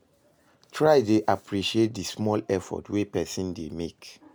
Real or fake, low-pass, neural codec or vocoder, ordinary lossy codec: real; none; none; none